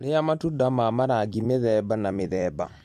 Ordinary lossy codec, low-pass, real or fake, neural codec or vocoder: MP3, 64 kbps; 14.4 kHz; fake; vocoder, 44.1 kHz, 128 mel bands every 256 samples, BigVGAN v2